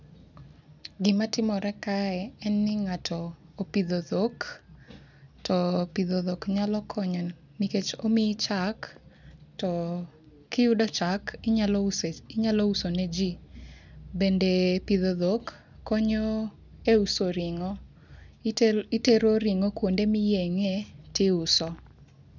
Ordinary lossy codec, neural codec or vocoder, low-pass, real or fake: none; none; 7.2 kHz; real